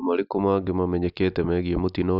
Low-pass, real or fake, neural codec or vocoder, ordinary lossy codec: 5.4 kHz; real; none; none